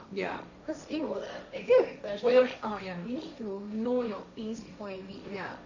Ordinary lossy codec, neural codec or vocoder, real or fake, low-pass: none; codec, 16 kHz, 1.1 kbps, Voila-Tokenizer; fake; 7.2 kHz